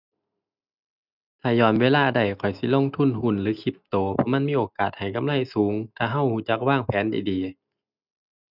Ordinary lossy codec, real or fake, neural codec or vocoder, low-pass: none; real; none; 5.4 kHz